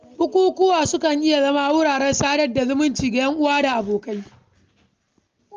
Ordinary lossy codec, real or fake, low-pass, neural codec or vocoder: Opus, 32 kbps; real; 7.2 kHz; none